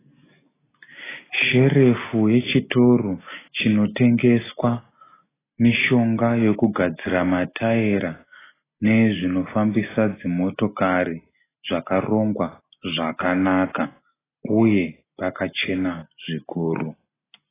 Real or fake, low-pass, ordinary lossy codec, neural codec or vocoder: real; 3.6 kHz; AAC, 16 kbps; none